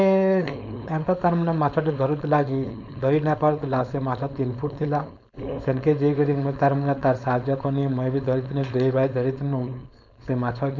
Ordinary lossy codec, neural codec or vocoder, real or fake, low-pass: none; codec, 16 kHz, 4.8 kbps, FACodec; fake; 7.2 kHz